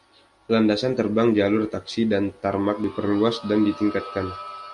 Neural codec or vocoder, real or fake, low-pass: none; real; 10.8 kHz